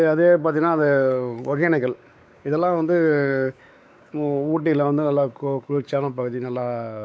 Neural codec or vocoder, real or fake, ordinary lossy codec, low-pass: codec, 16 kHz, 4 kbps, X-Codec, WavLM features, trained on Multilingual LibriSpeech; fake; none; none